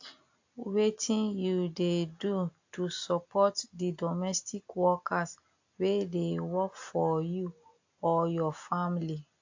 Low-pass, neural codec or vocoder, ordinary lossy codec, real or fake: 7.2 kHz; none; AAC, 48 kbps; real